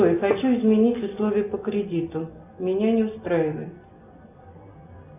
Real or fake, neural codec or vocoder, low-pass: real; none; 3.6 kHz